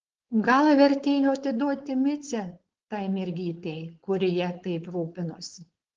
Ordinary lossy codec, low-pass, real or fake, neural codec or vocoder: Opus, 16 kbps; 7.2 kHz; fake; codec, 16 kHz, 4.8 kbps, FACodec